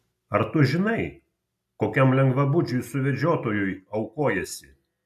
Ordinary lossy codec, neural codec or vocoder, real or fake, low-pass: AAC, 96 kbps; none; real; 14.4 kHz